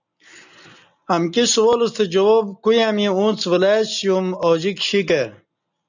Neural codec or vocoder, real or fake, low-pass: none; real; 7.2 kHz